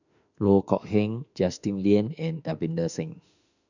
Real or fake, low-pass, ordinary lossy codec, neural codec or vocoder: fake; 7.2 kHz; none; autoencoder, 48 kHz, 32 numbers a frame, DAC-VAE, trained on Japanese speech